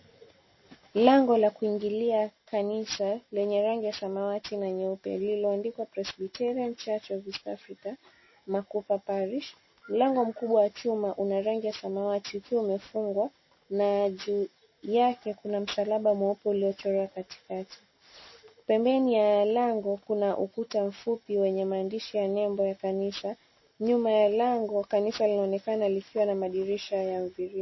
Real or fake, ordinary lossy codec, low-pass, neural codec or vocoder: real; MP3, 24 kbps; 7.2 kHz; none